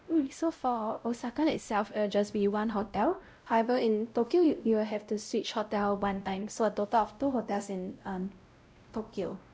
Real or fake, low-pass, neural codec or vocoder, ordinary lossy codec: fake; none; codec, 16 kHz, 0.5 kbps, X-Codec, WavLM features, trained on Multilingual LibriSpeech; none